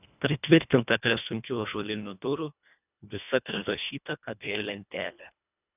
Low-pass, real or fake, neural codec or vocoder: 3.6 kHz; fake; codec, 24 kHz, 1.5 kbps, HILCodec